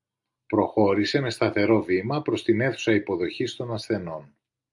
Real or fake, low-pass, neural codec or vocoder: real; 10.8 kHz; none